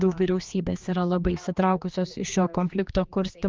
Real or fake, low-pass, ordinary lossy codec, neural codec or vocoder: fake; 7.2 kHz; Opus, 32 kbps; codec, 16 kHz, 2 kbps, X-Codec, HuBERT features, trained on general audio